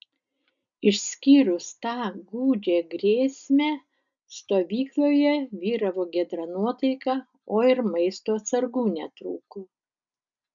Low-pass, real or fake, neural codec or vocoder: 7.2 kHz; real; none